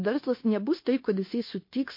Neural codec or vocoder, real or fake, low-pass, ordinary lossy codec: codec, 16 kHz, 0.9 kbps, LongCat-Audio-Codec; fake; 5.4 kHz; MP3, 32 kbps